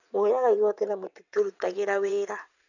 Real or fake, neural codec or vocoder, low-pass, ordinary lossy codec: fake; vocoder, 22.05 kHz, 80 mel bands, WaveNeXt; 7.2 kHz; none